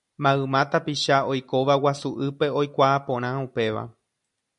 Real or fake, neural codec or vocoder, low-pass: real; none; 10.8 kHz